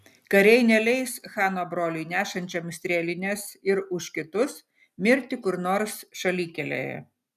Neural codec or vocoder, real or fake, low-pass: none; real; 14.4 kHz